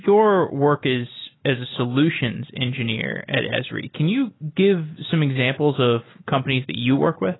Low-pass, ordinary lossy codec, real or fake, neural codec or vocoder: 7.2 kHz; AAC, 16 kbps; real; none